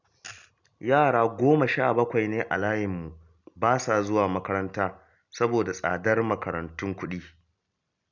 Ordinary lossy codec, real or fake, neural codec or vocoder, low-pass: none; real; none; 7.2 kHz